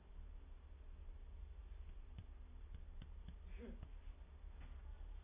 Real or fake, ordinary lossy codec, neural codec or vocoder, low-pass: real; AAC, 16 kbps; none; 3.6 kHz